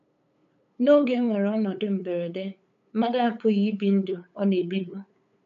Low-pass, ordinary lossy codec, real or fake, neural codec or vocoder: 7.2 kHz; none; fake; codec, 16 kHz, 8 kbps, FunCodec, trained on LibriTTS, 25 frames a second